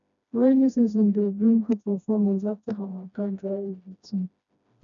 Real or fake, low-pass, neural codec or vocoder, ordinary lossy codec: fake; 7.2 kHz; codec, 16 kHz, 1 kbps, FreqCodec, smaller model; none